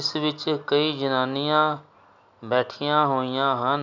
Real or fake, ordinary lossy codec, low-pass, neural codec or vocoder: real; none; 7.2 kHz; none